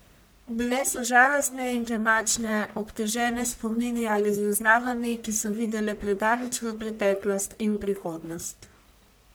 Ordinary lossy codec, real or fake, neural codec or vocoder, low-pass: none; fake; codec, 44.1 kHz, 1.7 kbps, Pupu-Codec; none